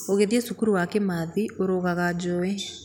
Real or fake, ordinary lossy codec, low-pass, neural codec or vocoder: real; none; 19.8 kHz; none